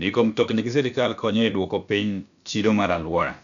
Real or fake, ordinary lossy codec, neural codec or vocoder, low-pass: fake; none; codec, 16 kHz, about 1 kbps, DyCAST, with the encoder's durations; 7.2 kHz